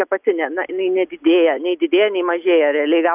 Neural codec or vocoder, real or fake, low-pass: none; real; 3.6 kHz